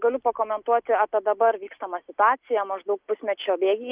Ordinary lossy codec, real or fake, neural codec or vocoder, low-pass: Opus, 32 kbps; real; none; 3.6 kHz